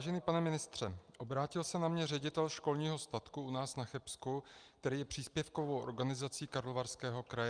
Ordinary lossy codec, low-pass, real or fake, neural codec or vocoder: Opus, 32 kbps; 9.9 kHz; real; none